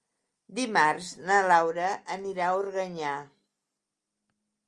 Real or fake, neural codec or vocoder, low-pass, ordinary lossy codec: real; none; 10.8 kHz; Opus, 32 kbps